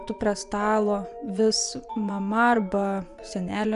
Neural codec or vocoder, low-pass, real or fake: vocoder, 24 kHz, 100 mel bands, Vocos; 10.8 kHz; fake